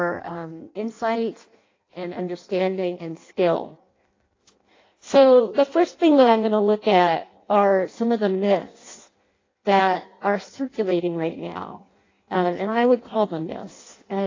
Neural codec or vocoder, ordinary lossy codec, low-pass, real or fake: codec, 16 kHz in and 24 kHz out, 0.6 kbps, FireRedTTS-2 codec; AAC, 32 kbps; 7.2 kHz; fake